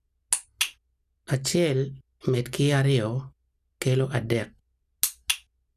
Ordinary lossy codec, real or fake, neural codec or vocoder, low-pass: none; real; none; 14.4 kHz